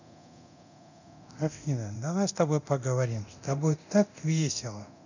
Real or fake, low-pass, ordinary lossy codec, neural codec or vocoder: fake; 7.2 kHz; none; codec, 24 kHz, 0.9 kbps, DualCodec